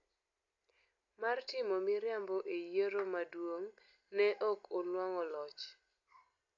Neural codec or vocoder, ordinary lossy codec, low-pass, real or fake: none; AAC, 48 kbps; 7.2 kHz; real